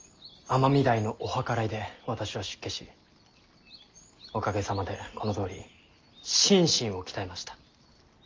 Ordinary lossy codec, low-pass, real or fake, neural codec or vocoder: Opus, 24 kbps; 7.2 kHz; real; none